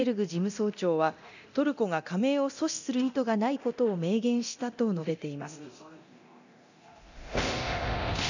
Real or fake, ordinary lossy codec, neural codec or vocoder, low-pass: fake; none; codec, 24 kHz, 0.9 kbps, DualCodec; 7.2 kHz